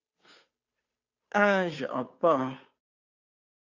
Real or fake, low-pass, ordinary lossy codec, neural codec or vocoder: fake; 7.2 kHz; Opus, 64 kbps; codec, 16 kHz, 2 kbps, FunCodec, trained on Chinese and English, 25 frames a second